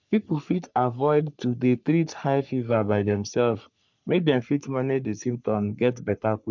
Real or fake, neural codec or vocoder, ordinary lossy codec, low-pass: fake; codec, 44.1 kHz, 3.4 kbps, Pupu-Codec; MP3, 64 kbps; 7.2 kHz